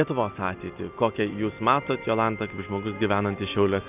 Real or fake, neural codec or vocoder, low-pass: real; none; 3.6 kHz